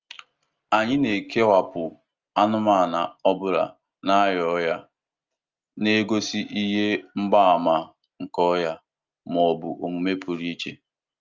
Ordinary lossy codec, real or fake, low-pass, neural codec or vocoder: Opus, 24 kbps; real; 7.2 kHz; none